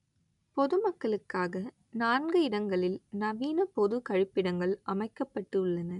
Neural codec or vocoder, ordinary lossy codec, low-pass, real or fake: none; none; 10.8 kHz; real